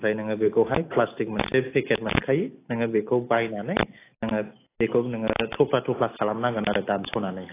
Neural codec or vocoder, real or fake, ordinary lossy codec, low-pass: none; real; AAC, 24 kbps; 3.6 kHz